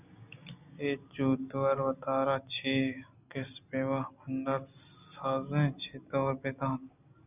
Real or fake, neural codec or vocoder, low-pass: real; none; 3.6 kHz